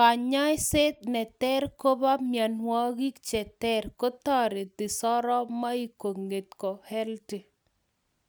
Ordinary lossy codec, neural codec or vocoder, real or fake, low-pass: none; none; real; none